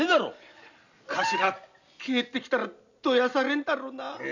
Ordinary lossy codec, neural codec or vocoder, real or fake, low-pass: none; none; real; 7.2 kHz